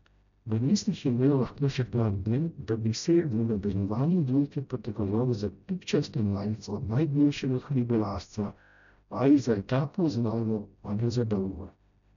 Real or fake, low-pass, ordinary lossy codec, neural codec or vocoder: fake; 7.2 kHz; none; codec, 16 kHz, 0.5 kbps, FreqCodec, smaller model